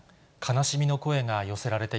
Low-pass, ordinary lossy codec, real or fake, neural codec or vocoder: none; none; real; none